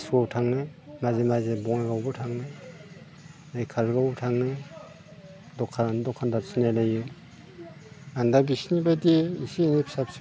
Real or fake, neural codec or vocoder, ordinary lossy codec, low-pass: real; none; none; none